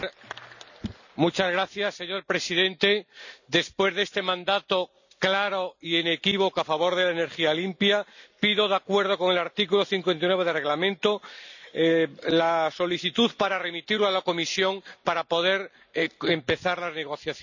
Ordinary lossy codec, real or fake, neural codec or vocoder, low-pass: none; real; none; 7.2 kHz